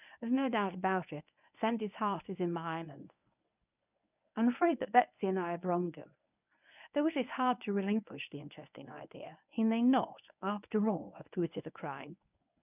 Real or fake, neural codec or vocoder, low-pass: fake; codec, 24 kHz, 0.9 kbps, WavTokenizer, medium speech release version 1; 3.6 kHz